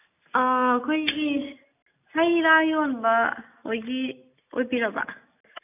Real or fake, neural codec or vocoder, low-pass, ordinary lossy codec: real; none; 3.6 kHz; none